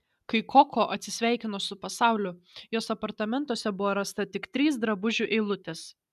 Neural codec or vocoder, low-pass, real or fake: none; 14.4 kHz; real